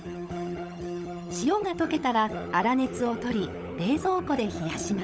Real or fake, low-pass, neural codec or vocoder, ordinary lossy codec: fake; none; codec, 16 kHz, 16 kbps, FunCodec, trained on LibriTTS, 50 frames a second; none